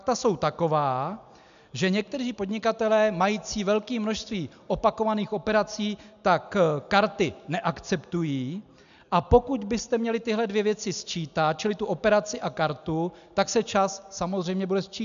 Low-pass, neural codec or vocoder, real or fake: 7.2 kHz; none; real